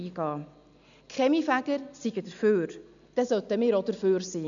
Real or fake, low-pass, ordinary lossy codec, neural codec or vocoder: real; 7.2 kHz; none; none